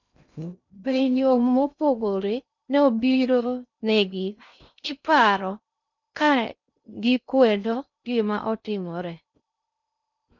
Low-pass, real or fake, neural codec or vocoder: 7.2 kHz; fake; codec, 16 kHz in and 24 kHz out, 0.6 kbps, FocalCodec, streaming, 2048 codes